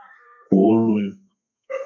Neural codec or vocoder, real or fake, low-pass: codec, 32 kHz, 1.9 kbps, SNAC; fake; 7.2 kHz